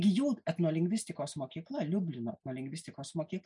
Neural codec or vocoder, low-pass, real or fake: none; 10.8 kHz; real